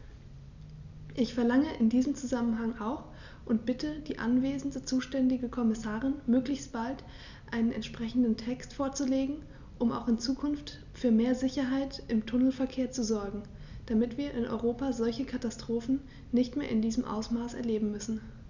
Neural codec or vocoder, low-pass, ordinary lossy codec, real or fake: none; 7.2 kHz; none; real